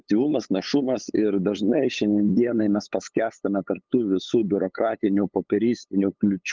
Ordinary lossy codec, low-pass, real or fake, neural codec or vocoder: Opus, 32 kbps; 7.2 kHz; fake; codec, 16 kHz, 8 kbps, FunCodec, trained on LibriTTS, 25 frames a second